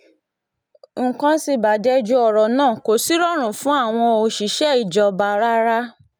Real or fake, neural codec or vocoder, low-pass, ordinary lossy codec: real; none; none; none